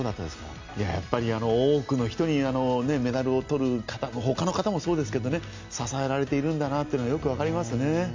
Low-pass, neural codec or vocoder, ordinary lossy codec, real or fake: 7.2 kHz; none; MP3, 64 kbps; real